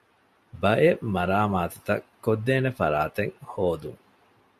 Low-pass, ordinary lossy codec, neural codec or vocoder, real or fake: 14.4 kHz; MP3, 96 kbps; none; real